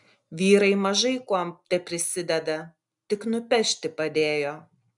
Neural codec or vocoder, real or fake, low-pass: none; real; 10.8 kHz